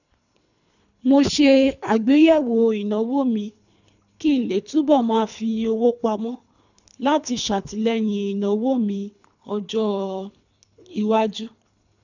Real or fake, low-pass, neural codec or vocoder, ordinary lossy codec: fake; 7.2 kHz; codec, 24 kHz, 3 kbps, HILCodec; none